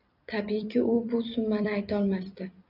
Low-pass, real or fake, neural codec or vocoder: 5.4 kHz; fake; vocoder, 44.1 kHz, 128 mel bands every 256 samples, BigVGAN v2